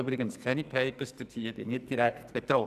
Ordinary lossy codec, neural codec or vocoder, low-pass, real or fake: AAC, 96 kbps; codec, 44.1 kHz, 2.6 kbps, SNAC; 14.4 kHz; fake